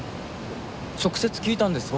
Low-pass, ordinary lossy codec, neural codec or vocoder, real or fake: none; none; none; real